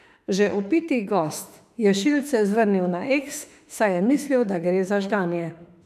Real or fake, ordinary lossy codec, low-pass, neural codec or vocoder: fake; none; 14.4 kHz; autoencoder, 48 kHz, 32 numbers a frame, DAC-VAE, trained on Japanese speech